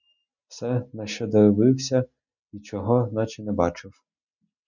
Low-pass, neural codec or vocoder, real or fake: 7.2 kHz; none; real